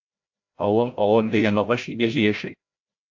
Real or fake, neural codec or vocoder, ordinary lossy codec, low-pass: fake; codec, 16 kHz, 0.5 kbps, FreqCodec, larger model; AAC, 48 kbps; 7.2 kHz